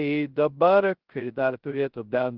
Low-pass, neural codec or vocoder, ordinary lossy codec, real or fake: 5.4 kHz; codec, 16 kHz, 0.2 kbps, FocalCodec; Opus, 16 kbps; fake